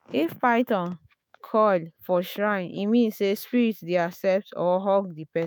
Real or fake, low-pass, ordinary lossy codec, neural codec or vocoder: fake; none; none; autoencoder, 48 kHz, 128 numbers a frame, DAC-VAE, trained on Japanese speech